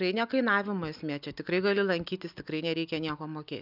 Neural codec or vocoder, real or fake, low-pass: none; real; 5.4 kHz